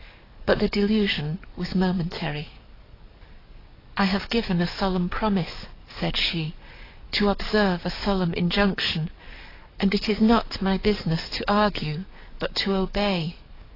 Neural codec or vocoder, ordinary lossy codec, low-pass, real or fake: codec, 44.1 kHz, 7.8 kbps, DAC; AAC, 24 kbps; 5.4 kHz; fake